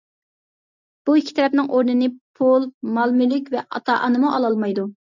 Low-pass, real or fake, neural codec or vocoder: 7.2 kHz; real; none